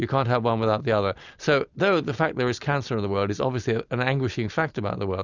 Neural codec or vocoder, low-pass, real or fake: none; 7.2 kHz; real